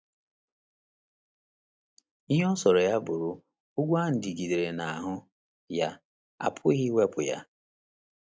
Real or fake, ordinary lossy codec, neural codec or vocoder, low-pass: real; none; none; none